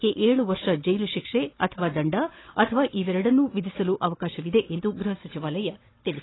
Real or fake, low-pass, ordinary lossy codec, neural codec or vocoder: fake; 7.2 kHz; AAC, 16 kbps; codec, 16 kHz, 16 kbps, FunCodec, trained on Chinese and English, 50 frames a second